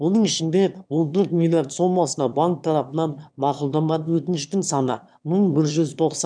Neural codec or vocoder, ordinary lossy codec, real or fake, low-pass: autoencoder, 22.05 kHz, a latent of 192 numbers a frame, VITS, trained on one speaker; none; fake; 9.9 kHz